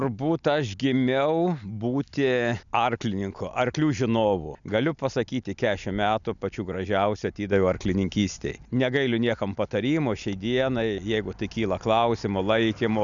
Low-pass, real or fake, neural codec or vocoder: 7.2 kHz; real; none